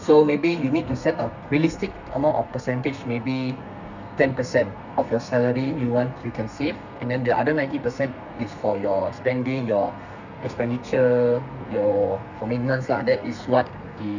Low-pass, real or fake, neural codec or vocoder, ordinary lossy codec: 7.2 kHz; fake; codec, 32 kHz, 1.9 kbps, SNAC; none